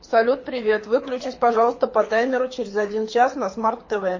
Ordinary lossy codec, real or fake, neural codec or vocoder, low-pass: MP3, 32 kbps; fake; codec, 24 kHz, 6 kbps, HILCodec; 7.2 kHz